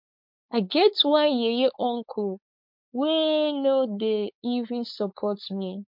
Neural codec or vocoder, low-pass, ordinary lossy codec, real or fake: codec, 16 kHz, 4.8 kbps, FACodec; 5.4 kHz; MP3, 48 kbps; fake